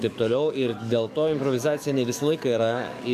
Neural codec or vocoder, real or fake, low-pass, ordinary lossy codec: codec, 44.1 kHz, 7.8 kbps, DAC; fake; 14.4 kHz; MP3, 96 kbps